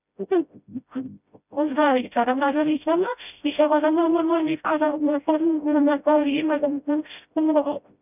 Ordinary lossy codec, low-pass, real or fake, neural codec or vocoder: none; 3.6 kHz; fake; codec, 16 kHz, 0.5 kbps, FreqCodec, smaller model